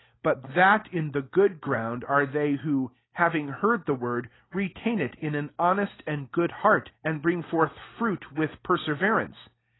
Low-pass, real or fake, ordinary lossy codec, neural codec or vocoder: 7.2 kHz; real; AAC, 16 kbps; none